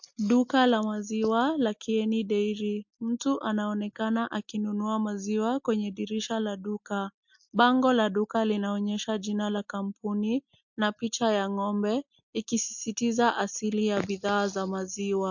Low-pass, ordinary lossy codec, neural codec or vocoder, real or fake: 7.2 kHz; MP3, 48 kbps; none; real